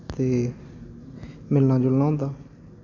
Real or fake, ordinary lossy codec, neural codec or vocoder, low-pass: real; none; none; 7.2 kHz